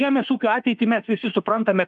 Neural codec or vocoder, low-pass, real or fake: autoencoder, 48 kHz, 32 numbers a frame, DAC-VAE, trained on Japanese speech; 10.8 kHz; fake